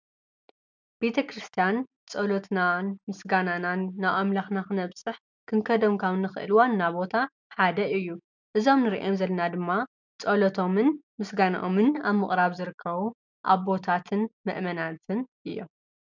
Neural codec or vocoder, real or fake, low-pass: none; real; 7.2 kHz